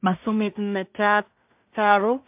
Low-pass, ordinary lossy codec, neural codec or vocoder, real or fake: 3.6 kHz; MP3, 32 kbps; codec, 16 kHz in and 24 kHz out, 0.4 kbps, LongCat-Audio-Codec, two codebook decoder; fake